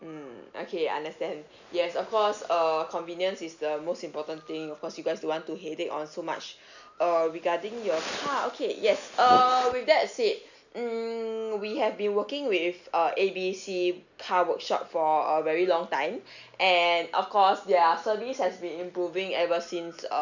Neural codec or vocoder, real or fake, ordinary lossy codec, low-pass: none; real; none; 7.2 kHz